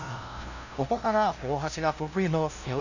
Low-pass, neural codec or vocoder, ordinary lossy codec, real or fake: 7.2 kHz; codec, 16 kHz, 0.5 kbps, FunCodec, trained on LibriTTS, 25 frames a second; none; fake